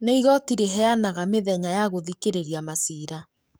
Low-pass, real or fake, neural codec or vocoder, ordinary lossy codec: none; fake; codec, 44.1 kHz, 7.8 kbps, DAC; none